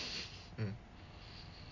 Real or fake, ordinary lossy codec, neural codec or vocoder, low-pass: real; none; none; 7.2 kHz